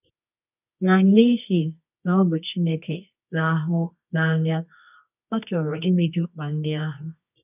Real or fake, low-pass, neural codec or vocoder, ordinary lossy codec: fake; 3.6 kHz; codec, 24 kHz, 0.9 kbps, WavTokenizer, medium music audio release; none